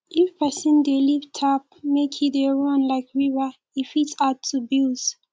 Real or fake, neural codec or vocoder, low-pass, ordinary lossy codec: real; none; none; none